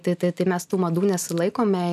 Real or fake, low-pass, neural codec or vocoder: real; 14.4 kHz; none